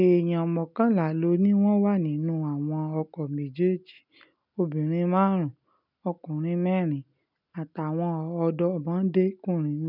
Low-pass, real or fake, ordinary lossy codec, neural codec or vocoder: 5.4 kHz; real; none; none